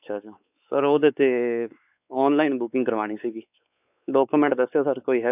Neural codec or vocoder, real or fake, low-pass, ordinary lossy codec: codec, 16 kHz, 4 kbps, X-Codec, WavLM features, trained on Multilingual LibriSpeech; fake; 3.6 kHz; none